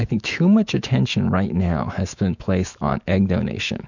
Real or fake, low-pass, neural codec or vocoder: fake; 7.2 kHz; autoencoder, 48 kHz, 128 numbers a frame, DAC-VAE, trained on Japanese speech